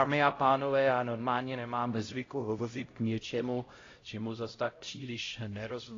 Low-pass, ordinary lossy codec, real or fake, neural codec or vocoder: 7.2 kHz; AAC, 32 kbps; fake; codec, 16 kHz, 0.5 kbps, X-Codec, HuBERT features, trained on LibriSpeech